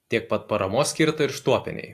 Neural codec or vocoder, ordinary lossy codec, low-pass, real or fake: none; AAC, 64 kbps; 14.4 kHz; real